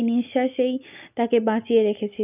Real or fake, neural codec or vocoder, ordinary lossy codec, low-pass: real; none; none; 3.6 kHz